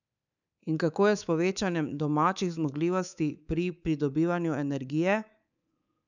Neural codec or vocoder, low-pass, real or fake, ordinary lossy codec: codec, 24 kHz, 3.1 kbps, DualCodec; 7.2 kHz; fake; none